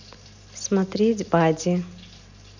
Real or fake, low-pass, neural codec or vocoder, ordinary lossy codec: real; 7.2 kHz; none; none